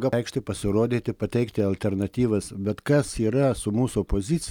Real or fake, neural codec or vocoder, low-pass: real; none; 19.8 kHz